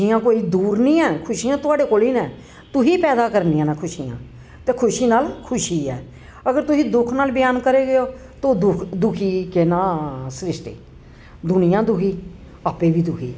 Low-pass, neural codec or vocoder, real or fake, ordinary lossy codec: none; none; real; none